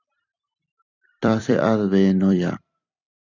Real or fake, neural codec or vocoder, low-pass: fake; vocoder, 44.1 kHz, 128 mel bands every 256 samples, BigVGAN v2; 7.2 kHz